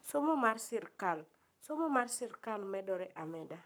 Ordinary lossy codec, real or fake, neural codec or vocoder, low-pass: none; fake; codec, 44.1 kHz, 7.8 kbps, Pupu-Codec; none